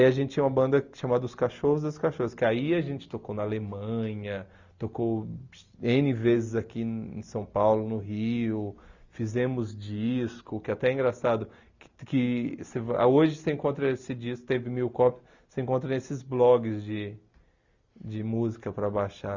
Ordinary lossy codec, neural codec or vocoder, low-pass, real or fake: Opus, 64 kbps; none; 7.2 kHz; real